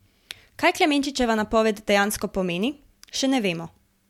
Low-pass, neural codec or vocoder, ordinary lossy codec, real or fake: 19.8 kHz; none; MP3, 96 kbps; real